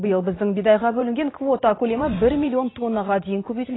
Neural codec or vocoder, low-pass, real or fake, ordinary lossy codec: vocoder, 44.1 kHz, 128 mel bands every 512 samples, BigVGAN v2; 7.2 kHz; fake; AAC, 16 kbps